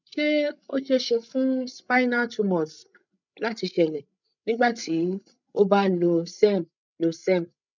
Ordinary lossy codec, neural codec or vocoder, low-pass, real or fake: none; codec, 16 kHz, 16 kbps, FreqCodec, larger model; 7.2 kHz; fake